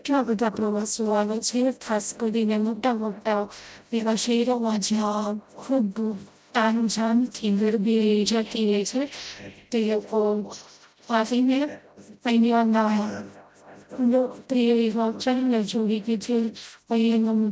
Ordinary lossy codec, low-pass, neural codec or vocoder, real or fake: none; none; codec, 16 kHz, 0.5 kbps, FreqCodec, smaller model; fake